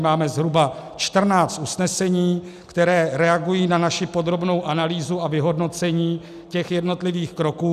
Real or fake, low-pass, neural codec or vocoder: real; 14.4 kHz; none